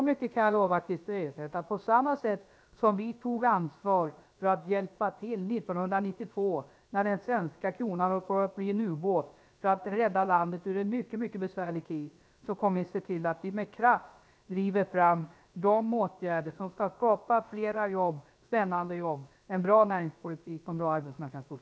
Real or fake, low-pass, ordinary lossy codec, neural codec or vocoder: fake; none; none; codec, 16 kHz, about 1 kbps, DyCAST, with the encoder's durations